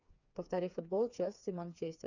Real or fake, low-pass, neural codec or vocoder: fake; 7.2 kHz; codec, 16 kHz, 4 kbps, FreqCodec, smaller model